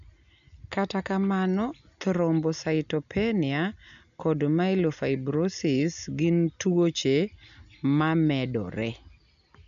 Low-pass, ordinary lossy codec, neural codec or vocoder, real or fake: 7.2 kHz; none; none; real